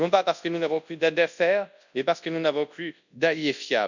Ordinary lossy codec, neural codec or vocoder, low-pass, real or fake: none; codec, 24 kHz, 0.9 kbps, WavTokenizer, large speech release; 7.2 kHz; fake